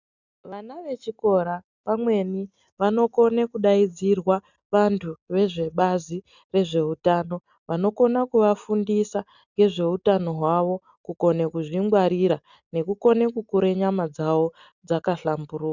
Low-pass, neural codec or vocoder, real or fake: 7.2 kHz; none; real